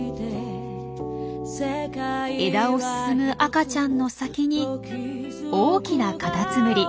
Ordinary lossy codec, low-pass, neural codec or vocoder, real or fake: none; none; none; real